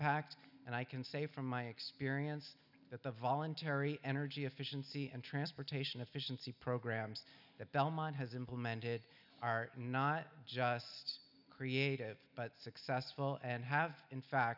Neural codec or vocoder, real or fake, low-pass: none; real; 5.4 kHz